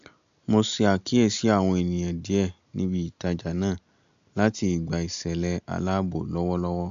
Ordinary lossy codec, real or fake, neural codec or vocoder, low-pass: AAC, 64 kbps; real; none; 7.2 kHz